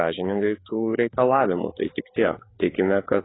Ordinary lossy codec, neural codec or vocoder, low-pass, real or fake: AAC, 16 kbps; codec, 16 kHz, 4 kbps, FreqCodec, larger model; 7.2 kHz; fake